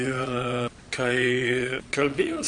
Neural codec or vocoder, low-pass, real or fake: vocoder, 22.05 kHz, 80 mel bands, Vocos; 9.9 kHz; fake